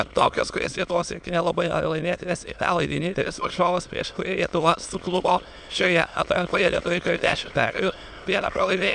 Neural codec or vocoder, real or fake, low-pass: autoencoder, 22.05 kHz, a latent of 192 numbers a frame, VITS, trained on many speakers; fake; 9.9 kHz